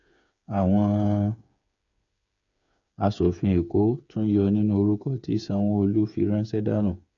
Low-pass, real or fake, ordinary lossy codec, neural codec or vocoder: 7.2 kHz; fake; none; codec, 16 kHz, 8 kbps, FreqCodec, smaller model